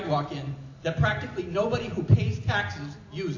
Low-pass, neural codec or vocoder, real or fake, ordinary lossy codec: 7.2 kHz; none; real; AAC, 48 kbps